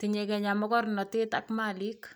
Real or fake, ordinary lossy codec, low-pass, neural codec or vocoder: real; none; none; none